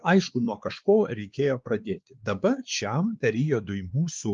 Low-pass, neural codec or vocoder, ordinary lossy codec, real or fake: 7.2 kHz; codec, 16 kHz, 2 kbps, X-Codec, WavLM features, trained on Multilingual LibriSpeech; Opus, 32 kbps; fake